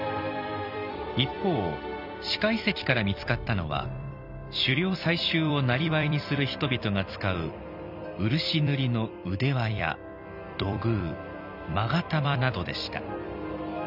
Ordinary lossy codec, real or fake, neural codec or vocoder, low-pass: none; fake; vocoder, 44.1 kHz, 128 mel bands every 256 samples, BigVGAN v2; 5.4 kHz